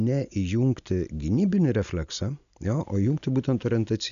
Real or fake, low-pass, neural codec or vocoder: real; 7.2 kHz; none